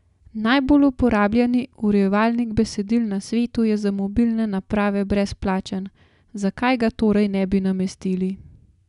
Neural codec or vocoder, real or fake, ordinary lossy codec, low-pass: none; real; none; 10.8 kHz